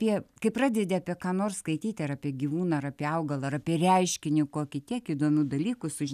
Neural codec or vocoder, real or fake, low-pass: none; real; 14.4 kHz